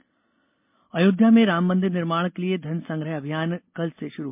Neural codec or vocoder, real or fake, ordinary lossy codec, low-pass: none; real; MP3, 32 kbps; 3.6 kHz